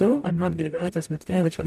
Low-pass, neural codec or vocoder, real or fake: 14.4 kHz; codec, 44.1 kHz, 0.9 kbps, DAC; fake